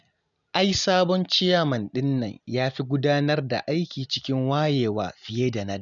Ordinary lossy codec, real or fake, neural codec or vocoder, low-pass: none; real; none; 7.2 kHz